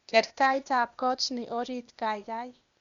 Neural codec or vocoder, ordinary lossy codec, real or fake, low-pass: codec, 16 kHz, 0.8 kbps, ZipCodec; Opus, 64 kbps; fake; 7.2 kHz